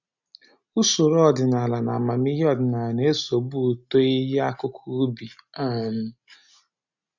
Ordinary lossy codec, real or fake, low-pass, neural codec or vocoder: none; real; 7.2 kHz; none